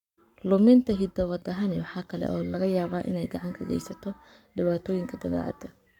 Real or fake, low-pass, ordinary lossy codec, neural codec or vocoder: fake; 19.8 kHz; none; codec, 44.1 kHz, 7.8 kbps, Pupu-Codec